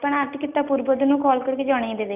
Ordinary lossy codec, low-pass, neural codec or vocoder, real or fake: none; 3.6 kHz; none; real